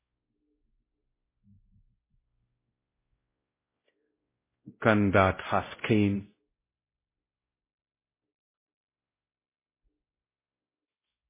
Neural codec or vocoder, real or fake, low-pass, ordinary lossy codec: codec, 16 kHz, 0.5 kbps, X-Codec, WavLM features, trained on Multilingual LibriSpeech; fake; 3.6 kHz; MP3, 16 kbps